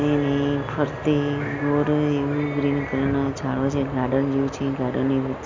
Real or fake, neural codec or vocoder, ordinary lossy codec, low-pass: real; none; MP3, 64 kbps; 7.2 kHz